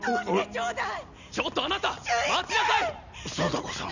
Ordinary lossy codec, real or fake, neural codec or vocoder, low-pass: none; real; none; 7.2 kHz